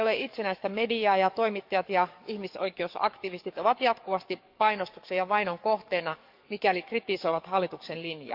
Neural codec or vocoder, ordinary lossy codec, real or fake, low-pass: codec, 44.1 kHz, 7.8 kbps, DAC; none; fake; 5.4 kHz